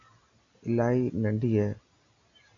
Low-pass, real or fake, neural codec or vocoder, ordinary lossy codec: 7.2 kHz; real; none; MP3, 64 kbps